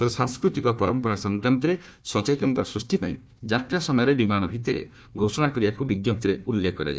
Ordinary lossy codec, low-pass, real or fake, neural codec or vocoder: none; none; fake; codec, 16 kHz, 1 kbps, FunCodec, trained on Chinese and English, 50 frames a second